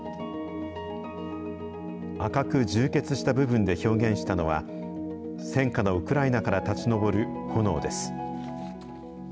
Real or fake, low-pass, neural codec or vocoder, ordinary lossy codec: real; none; none; none